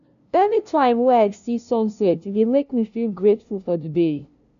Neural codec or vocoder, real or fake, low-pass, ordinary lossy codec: codec, 16 kHz, 0.5 kbps, FunCodec, trained on LibriTTS, 25 frames a second; fake; 7.2 kHz; none